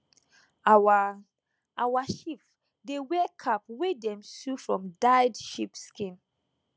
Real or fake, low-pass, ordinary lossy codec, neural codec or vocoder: real; none; none; none